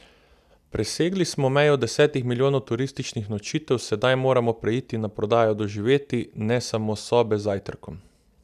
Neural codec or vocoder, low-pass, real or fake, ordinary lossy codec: none; 14.4 kHz; real; none